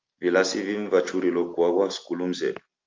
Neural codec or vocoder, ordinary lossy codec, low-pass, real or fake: none; Opus, 24 kbps; 7.2 kHz; real